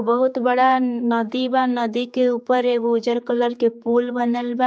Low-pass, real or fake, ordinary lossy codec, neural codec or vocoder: none; fake; none; codec, 16 kHz, 4 kbps, X-Codec, HuBERT features, trained on general audio